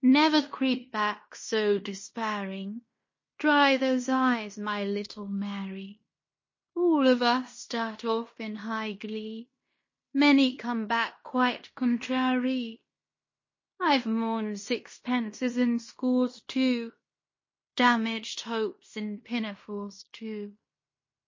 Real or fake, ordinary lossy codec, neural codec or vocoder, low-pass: fake; MP3, 32 kbps; codec, 16 kHz in and 24 kHz out, 0.9 kbps, LongCat-Audio-Codec, fine tuned four codebook decoder; 7.2 kHz